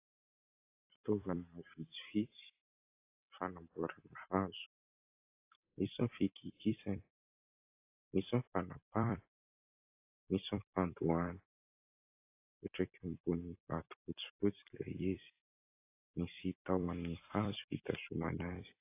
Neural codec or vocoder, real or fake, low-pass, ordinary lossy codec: vocoder, 44.1 kHz, 128 mel bands every 256 samples, BigVGAN v2; fake; 3.6 kHz; AAC, 24 kbps